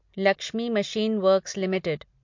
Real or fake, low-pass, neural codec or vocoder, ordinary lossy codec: real; 7.2 kHz; none; MP3, 48 kbps